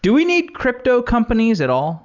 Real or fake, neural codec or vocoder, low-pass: real; none; 7.2 kHz